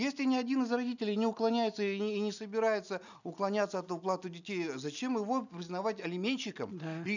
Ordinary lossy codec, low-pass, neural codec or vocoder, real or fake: none; 7.2 kHz; none; real